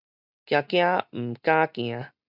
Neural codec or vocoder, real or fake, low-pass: none; real; 5.4 kHz